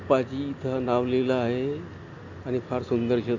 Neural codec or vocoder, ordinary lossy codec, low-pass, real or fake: none; AAC, 48 kbps; 7.2 kHz; real